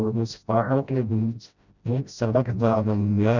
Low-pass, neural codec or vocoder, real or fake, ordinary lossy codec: 7.2 kHz; codec, 16 kHz, 0.5 kbps, FreqCodec, smaller model; fake; none